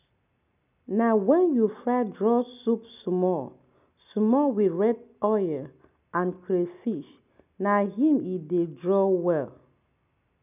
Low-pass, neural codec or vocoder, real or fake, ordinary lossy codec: 3.6 kHz; none; real; none